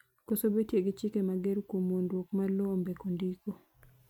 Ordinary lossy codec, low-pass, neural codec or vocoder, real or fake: MP3, 96 kbps; 19.8 kHz; none; real